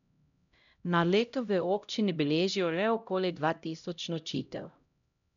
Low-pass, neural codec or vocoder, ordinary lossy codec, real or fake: 7.2 kHz; codec, 16 kHz, 0.5 kbps, X-Codec, HuBERT features, trained on LibriSpeech; none; fake